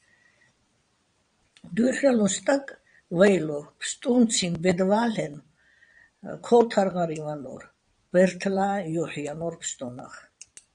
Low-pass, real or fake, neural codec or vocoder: 9.9 kHz; fake; vocoder, 22.05 kHz, 80 mel bands, Vocos